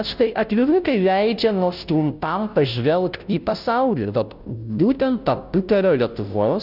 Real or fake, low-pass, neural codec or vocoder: fake; 5.4 kHz; codec, 16 kHz, 0.5 kbps, FunCodec, trained on Chinese and English, 25 frames a second